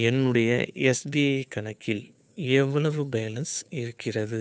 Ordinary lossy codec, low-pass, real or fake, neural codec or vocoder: none; none; fake; codec, 16 kHz, 2 kbps, FunCodec, trained on Chinese and English, 25 frames a second